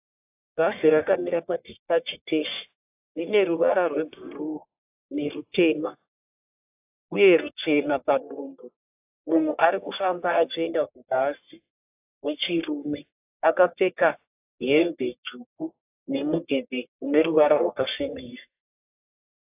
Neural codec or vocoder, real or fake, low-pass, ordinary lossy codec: codec, 44.1 kHz, 1.7 kbps, Pupu-Codec; fake; 3.6 kHz; AAC, 32 kbps